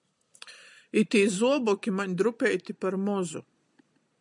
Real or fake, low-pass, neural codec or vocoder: real; 10.8 kHz; none